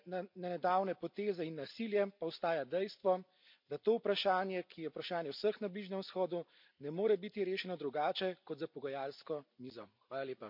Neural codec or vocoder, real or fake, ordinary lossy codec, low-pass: none; real; MP3, 48 kbps; 5.4 kHz